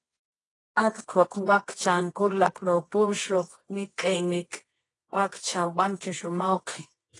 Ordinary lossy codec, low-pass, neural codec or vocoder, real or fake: AAC, 32 kbps; 10.8 kHz; codec, 24 kHz, 0.9 kbps, WavTokenizer, medium music audio release; fake